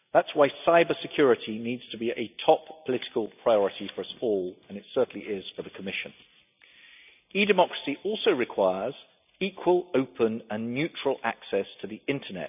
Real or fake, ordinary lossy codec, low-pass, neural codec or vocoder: real; none; 3.6 kHz; none